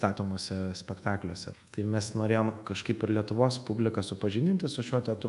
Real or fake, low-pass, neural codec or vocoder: fake; 10.8 kHz; codec, 24 kHz, 1.2 kbps, DualCodec